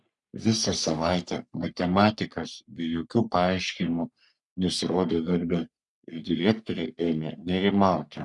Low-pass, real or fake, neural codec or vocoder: 10.8 kHz; fake; codec, 44.1 kHz, 3.4 kbps, Pupu-Codec